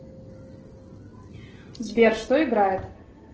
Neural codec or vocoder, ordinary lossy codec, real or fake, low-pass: none; Opus, 16 kbps; real; 7.2 kHz